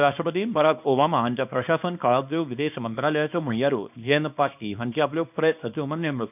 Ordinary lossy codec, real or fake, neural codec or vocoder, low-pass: none; fake; codec, 24 kHz, 0.9 kbps, WavTokenizer, small release; 3.6 kHz